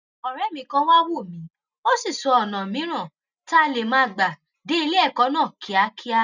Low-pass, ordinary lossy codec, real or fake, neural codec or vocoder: 7.2 kHz; none; real; none